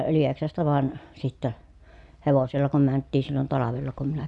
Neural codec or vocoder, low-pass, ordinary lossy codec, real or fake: none; 10.8 kHz; none; real